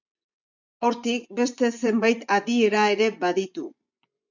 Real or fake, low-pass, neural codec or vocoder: fake; 7.2 kHz; vocoder, 24 kHz, 100 mel bands, Vocos